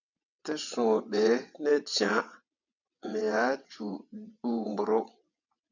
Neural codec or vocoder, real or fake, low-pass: vocoder, 22.05 kHz, 80 mel bands, WaveNeXt; fake; 7.2 kHz